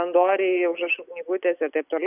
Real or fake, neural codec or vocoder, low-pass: real; none; 3.6 kHz